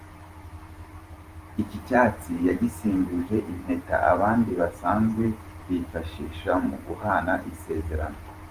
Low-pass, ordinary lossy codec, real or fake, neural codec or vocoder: 14.4 kHz; Opus, 32 kbps; fake; vocoder, 44.1 kHz, 128 mel bands every 512 samples, BigVGAN v2